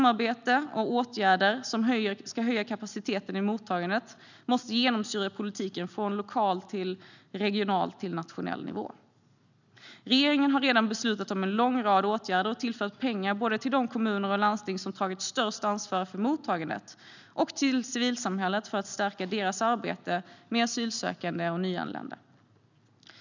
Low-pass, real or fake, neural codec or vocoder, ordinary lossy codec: 7.2 kHz; real; none; none